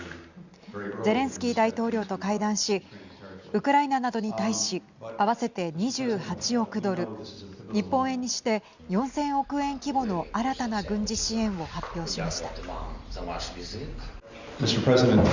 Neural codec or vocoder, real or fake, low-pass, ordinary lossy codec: none; real; 7.2 kHz; Opus, 64 kbps